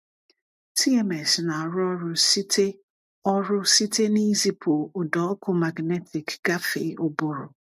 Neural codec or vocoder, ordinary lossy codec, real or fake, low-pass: none; MP3, 64 kbps; real; 14.4 kHz